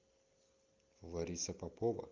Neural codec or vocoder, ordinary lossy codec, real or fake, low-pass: none; Opus, 32 kbps; real; 7.2 kHz